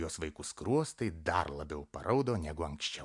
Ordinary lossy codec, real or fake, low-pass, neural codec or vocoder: MP3, 64 kbps; real; 10.8 kHz; none